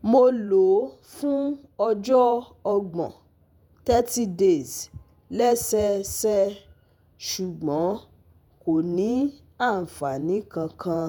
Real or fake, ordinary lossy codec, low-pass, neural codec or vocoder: fake; none; none; vocoder, 48 kHz, 128 mel bands, Vocos